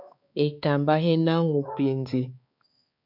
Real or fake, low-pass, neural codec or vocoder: fake; 5.4 kHz; codec, 16 kHz, 4 kbps, X-Codec, HuBERT features, trained on balanced general audio